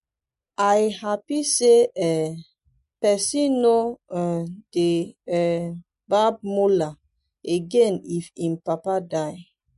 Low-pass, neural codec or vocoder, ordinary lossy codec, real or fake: 14.4 kHz; none; MP3, 48 kbps; real